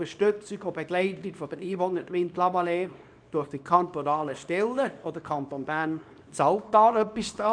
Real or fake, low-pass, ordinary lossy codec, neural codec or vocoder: fake; 9.9 kHz; none; codec, 24 kHz, 0.9 kbps, WavTokenizer, small release